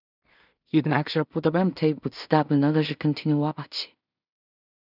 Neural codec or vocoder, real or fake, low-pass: codec, 16 kHz in and 24 kHz out, 0.4 kbps, LongCat-Audio-Codec, two codebook decoder; fake; 5.4 kHz